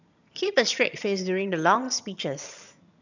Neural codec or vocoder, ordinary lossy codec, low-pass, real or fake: vocoder, 22.05 kHz, 80 mel bands, HiFi-GAN; none; 7.2 kHz; fake